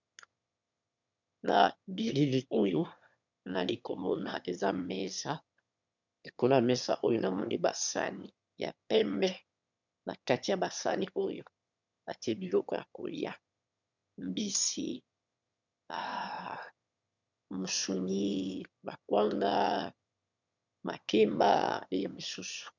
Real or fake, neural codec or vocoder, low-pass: fake; autoencoder, 22.05 kHz, a latent of 192 numbers a frame, VITS, trained on one speaker; 7.2 kHz